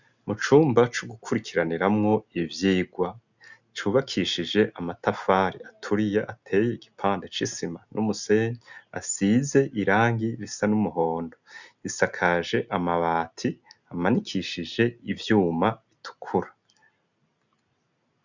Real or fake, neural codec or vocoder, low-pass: real; none; 7.2 kHz